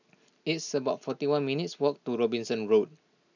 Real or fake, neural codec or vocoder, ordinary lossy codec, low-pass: real; none; none; 7.2 kHz